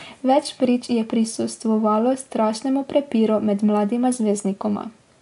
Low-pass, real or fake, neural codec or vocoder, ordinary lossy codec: 10.8 kHz; real; none; AAC, 64 kbps